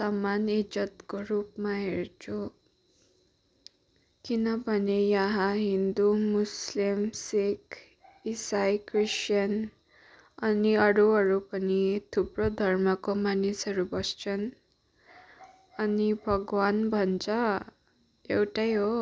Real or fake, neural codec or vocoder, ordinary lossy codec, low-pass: real; none; none; none